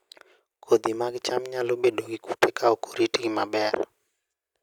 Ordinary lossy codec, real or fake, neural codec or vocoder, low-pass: none; real; none; none